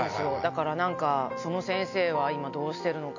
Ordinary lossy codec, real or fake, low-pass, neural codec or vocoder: none; real; 7.2 kHz; none